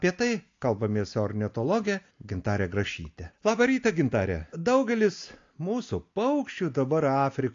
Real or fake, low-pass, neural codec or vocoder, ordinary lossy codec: real; 7.2 kHz; none; AAC, 48 kbps